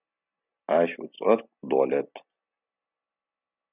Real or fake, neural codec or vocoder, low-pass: real; none; 3.6 kHz